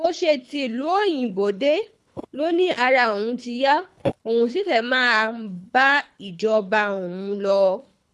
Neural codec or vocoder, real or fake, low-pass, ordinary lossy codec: codec, 24 kHz, 3 kbps, HILCodec; fake; none; none